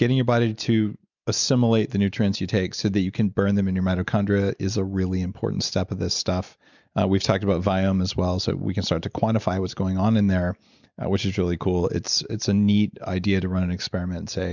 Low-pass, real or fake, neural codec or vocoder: 7.2 kHz; real; none